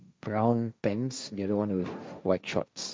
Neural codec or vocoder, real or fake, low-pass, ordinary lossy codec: codec, 16 kHz, 1.1 kbps, Voila-Tokenizer; fake; none; none